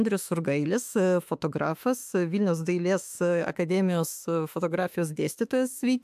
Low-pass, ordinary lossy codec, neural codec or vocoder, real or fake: 14.4 kHz; AAC, 96 kbps; autoencoder, 48 kHz, 32 numbers a frame, DAC-VAE, trained on Japanese speech; fake